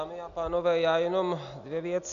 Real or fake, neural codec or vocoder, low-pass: real; none; 7.2 kHz